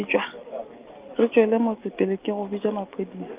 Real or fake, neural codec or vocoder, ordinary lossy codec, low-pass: real; none; Opus, 24 kbps; 3.6 kHz